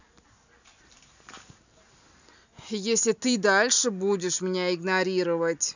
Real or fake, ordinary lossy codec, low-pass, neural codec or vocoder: real; none; 7.2 kHz; none